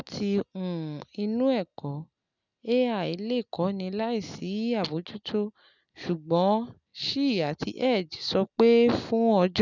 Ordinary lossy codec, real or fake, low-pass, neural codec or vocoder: none; real; 7.2 kHz; none